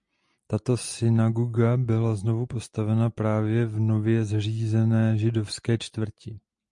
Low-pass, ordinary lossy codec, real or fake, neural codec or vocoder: 10.8 kHz; MP3, 64 kbps; real; none